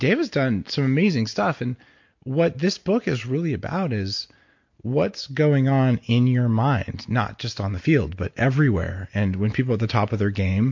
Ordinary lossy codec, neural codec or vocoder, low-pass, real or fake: MP3, 48 kbps; none; 7.2 kHz; real